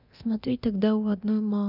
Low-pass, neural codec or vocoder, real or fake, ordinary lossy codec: 5.4 kHz; codec, 24 kHz, 0.9 kbps, DualCodec; fake; none